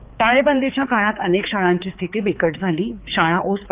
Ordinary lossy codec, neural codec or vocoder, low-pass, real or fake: Opus, 24 kbps; codec, 16 kHz, 4 kbps, X-Codec, HuBERT features, trained on balanced general audio; 3.6 kHz; fake